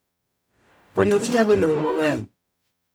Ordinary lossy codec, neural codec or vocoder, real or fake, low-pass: none; codec, 44.1 kHz, 0.9 kbps, DAC; fake; none